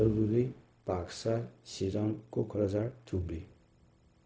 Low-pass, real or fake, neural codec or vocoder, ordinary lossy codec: none; fake; codec, 16 kHz, 0.4 kbps, LongCat-Audio-Codec; none